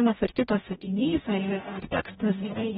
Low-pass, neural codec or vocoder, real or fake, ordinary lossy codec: 19.8 kHz; codec, 44.1 kHz, 0.9 kbps, DAC; fake; AAC, 16 kbps